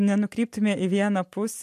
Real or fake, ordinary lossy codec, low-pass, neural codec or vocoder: real; MP3, 64 kbps; 14.4 kHz; none